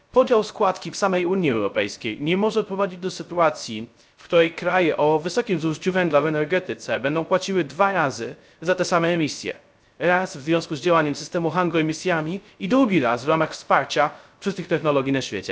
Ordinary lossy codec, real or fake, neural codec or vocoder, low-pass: none; fake; codec, 16 kHz, 0.3 kbps, FocalCodec; none